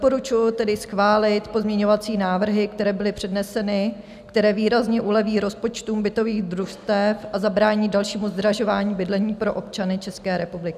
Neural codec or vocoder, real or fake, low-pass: none; real; 14.4 kHz